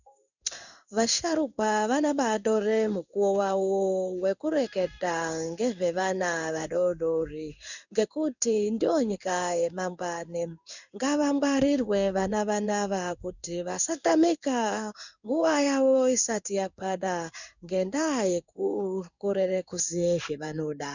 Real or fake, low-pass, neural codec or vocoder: fake; 7.2 kHz; codec, 16 kHz in and 24 kHz out, 1 kbps, XY-Tokenizer